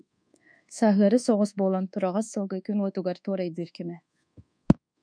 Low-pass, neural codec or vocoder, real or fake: 9.9 kHz; codec, 24 kHz, 1.2 kbps, DualCodec; fake